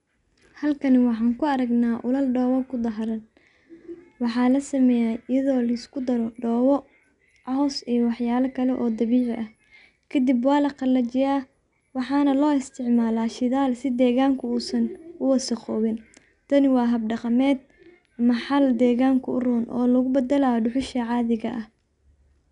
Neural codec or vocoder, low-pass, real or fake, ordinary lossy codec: none; 10.8 kHz; real; none